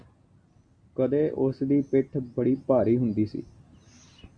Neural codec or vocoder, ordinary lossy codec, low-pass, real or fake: none; MP3, 64 kbps; 9.9 kHz; real